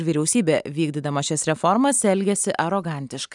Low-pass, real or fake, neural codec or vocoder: 10.8 kHz; real; none